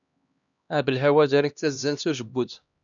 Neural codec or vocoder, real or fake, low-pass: codec, 16 kHz, 1 kbps, X-Codec, HuBERT features, trained on LibriSpeech; fake; 7.2 kHz